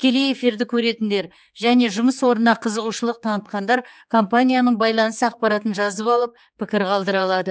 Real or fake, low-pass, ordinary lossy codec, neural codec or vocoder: fake; none; none; codec, 16 kHz, 4 kbps, X-Codec, HuBERT features, trained on general audio